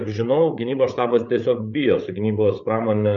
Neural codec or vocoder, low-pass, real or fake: codec, 16 kHz, 8 kbps, FreqCodec, larger model; 7.2 kHz; fake